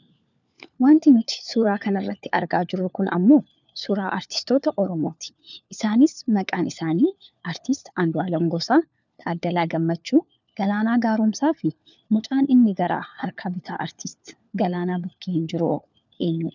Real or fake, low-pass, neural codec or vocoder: fake; 7.2 kHz; codec, 16 kHz, 4 kbps, FunCodec, trained on LibriTTS, 50 frames a second